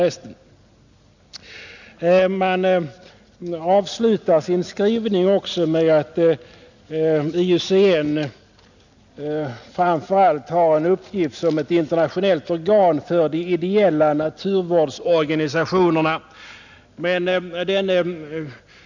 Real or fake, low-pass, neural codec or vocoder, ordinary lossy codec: real; 7.2 kHz; none; none